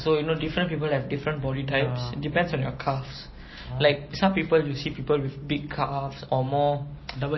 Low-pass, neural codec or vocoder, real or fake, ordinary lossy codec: 7.2 kHz; none; real; MP3, 24 kbps